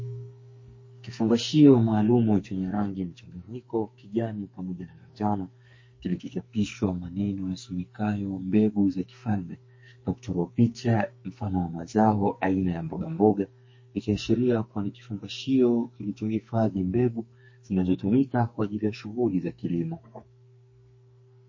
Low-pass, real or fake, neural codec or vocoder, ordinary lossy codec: 7.2 kHz; fake; codec, 44.1 kHz, 2.6 kbps, SNAC; MP3, 32 kbps